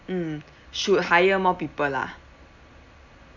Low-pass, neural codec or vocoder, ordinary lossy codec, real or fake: 7.2 kHz; none; none; real